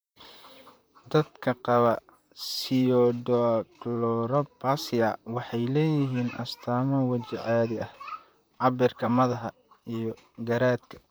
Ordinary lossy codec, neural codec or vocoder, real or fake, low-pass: none; vocoder, 44.1 kHz, 128 mel bands, Pupu-Vocoder; fake; none